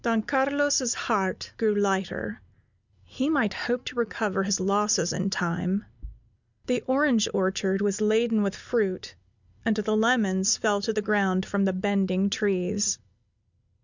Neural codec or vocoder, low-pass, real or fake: none; 7.2 kHz; real